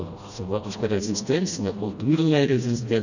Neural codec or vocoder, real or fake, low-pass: codec, 16 kHz, 1 kbps, FreqCodec, smaller model; fake; 7.2 kHz